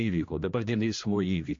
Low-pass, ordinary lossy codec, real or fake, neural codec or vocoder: 7.2 kHz; MP3, 48 kbps; fake; codec, 16 kHz, 1 kbps, X-Codec, HuBERT features, trained on general audio